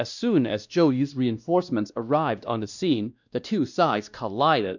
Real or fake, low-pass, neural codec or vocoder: fake; 7.2 kHz; codec, 16 kHz, 1 kbps, X-Codec, WavLM features, trained on Multilingual LibriSpeech